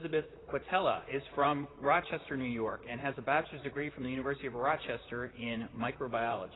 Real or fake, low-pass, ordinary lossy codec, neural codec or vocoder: fake; 7.2 kHz; AAC, 16 kbps; vocoder, 44.1 kHz, 128 mel bands, Pupu-Vocoder